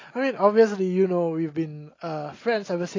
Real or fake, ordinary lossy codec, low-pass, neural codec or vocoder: real; AAC, 32 kbps; 7.2 kHz; none